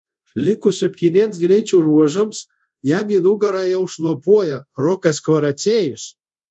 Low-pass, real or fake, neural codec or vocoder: 10.8 kHz; fake; codec, 24 kHz, 0.5 kbps, DualCodec